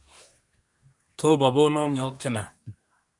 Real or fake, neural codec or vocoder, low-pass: fake; codec, 24 kHz, 1 kbps, SNAC; 10.8 kHz